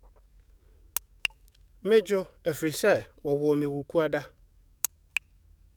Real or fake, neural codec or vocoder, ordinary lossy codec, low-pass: fake; codec, 44.1 kHz, 7.8 kbps, DAC; none; 19.8 kHz